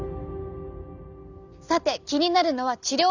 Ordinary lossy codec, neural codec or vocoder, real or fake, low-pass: none; none; real; 7.2 kHz